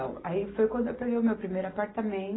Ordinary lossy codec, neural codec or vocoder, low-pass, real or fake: AAC, 16 kbps; none; 7.2 kHz; real